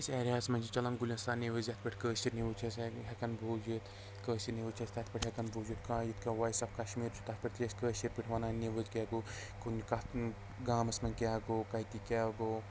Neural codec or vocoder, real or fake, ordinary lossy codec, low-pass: none; real; none; none